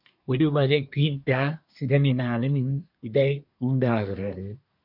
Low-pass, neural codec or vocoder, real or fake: 5.4 kHz; codec, 24 kHz, 1 kbps, SNAC; fake